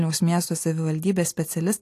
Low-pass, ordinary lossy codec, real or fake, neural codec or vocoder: 14.4 kHz; AAC, 64 kbps; real; none